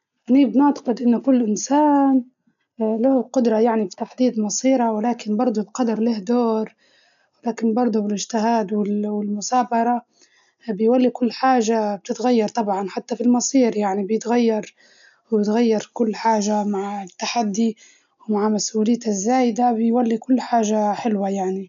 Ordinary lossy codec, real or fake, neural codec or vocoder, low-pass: none; real; none; 7.2 kHz